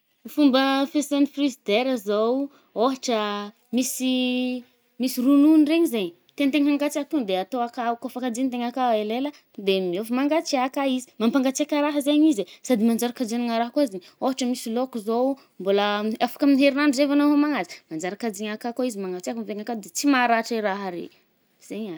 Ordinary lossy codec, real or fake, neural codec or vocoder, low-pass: none; real; none; none